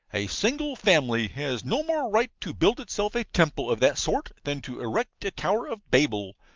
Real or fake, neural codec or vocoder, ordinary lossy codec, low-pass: real; none; Opus, 24 kbps; 7.2 kHz